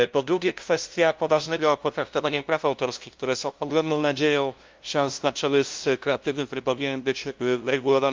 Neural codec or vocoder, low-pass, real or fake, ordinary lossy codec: codec, 16 kHz, 0.5 kbps, FunCodec, trained on LibriTTS, 25 frames a second; 7.2 kHz; fake; Opus, 32 kbps